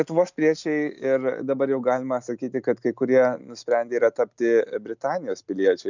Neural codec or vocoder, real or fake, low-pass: none; real; 7.2 kHz